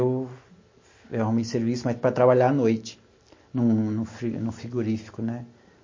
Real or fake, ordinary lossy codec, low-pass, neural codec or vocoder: real; MP3, 32 kbps; 7.2 kHz; none